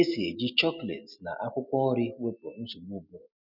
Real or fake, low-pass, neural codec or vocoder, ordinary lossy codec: real; 5.4 kHz; none; none